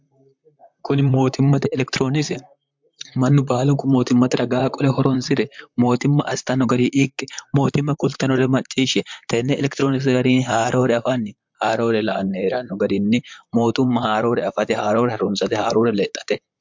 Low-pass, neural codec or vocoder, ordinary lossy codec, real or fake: 7.2 kHz; vocoder, 44.1 kHz, 128 mel bands, Pupu-Vocoder; MP3, 64 kbps; fake